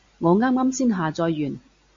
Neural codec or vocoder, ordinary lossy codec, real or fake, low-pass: none; MP3, 48 kbps; real; 7.2 kHz